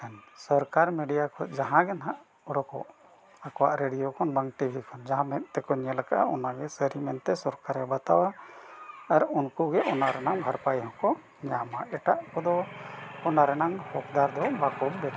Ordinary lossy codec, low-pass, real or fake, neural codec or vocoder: none; none; real; none